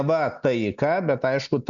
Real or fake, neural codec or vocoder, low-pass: real; none; 7.2 kHz